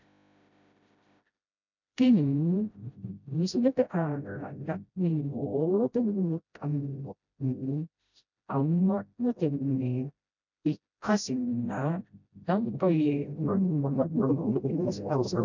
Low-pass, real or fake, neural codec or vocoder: 7.2 kHz; fake; codec, 16 kHz, 0.5 kbps, FreqCodec, smaller model